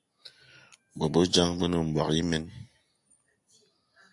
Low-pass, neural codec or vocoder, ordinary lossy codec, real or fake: 10.8 kHz; none; AAC, 64 kbps; real